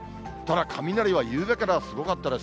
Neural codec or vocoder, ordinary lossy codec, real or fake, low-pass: none; none; real; none